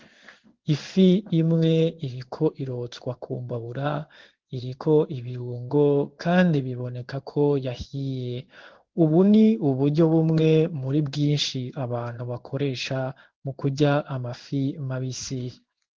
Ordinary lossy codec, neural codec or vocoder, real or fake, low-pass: Opus, 16 kbps; codec, 16 kHz in and 24 kHz out, 1 kbps, XY-Tokenizer; fake; 7.2 kHz